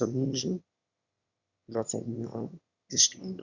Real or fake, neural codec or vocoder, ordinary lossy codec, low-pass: fake; autoencoder, 22.05 kHz, a latent of 192 numbers a frame, VITS, trained on one speaker; Opus, 64 kbps; 7.2 kHz